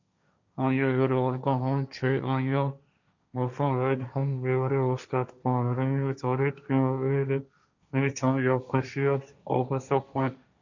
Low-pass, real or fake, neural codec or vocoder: 7.2 kHz; fake; codec, 16 kHz, 1.1 kbps, Voila-Tokenizer